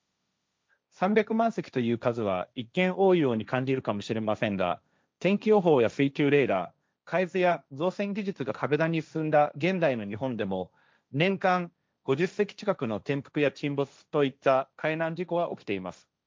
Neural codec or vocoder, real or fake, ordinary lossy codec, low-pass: codec, 16 kHz, 1.1 kbps, Voila-Tokenizer; fake; none; none